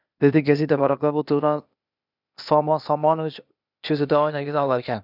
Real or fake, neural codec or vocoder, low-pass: fake; codec, 16 kHz, 0.8 kbps, ZipCodec; 5.4 kHz